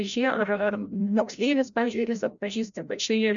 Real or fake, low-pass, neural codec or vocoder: fake; 7.2 kHz; codec, 16 kHz, 0.5 kbps, FreqCodec, larger model